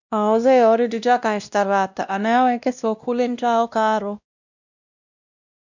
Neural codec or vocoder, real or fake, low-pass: codec, 16 kHz, 1 kbps, X-Codec, WavLM features, trained on Multilingual LibriSpeech; fake; 7.2 kHz